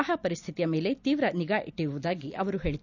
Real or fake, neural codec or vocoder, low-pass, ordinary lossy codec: real; none; 7.2 kHz; none